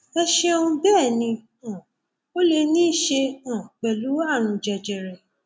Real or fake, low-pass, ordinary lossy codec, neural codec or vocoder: real; none; none; none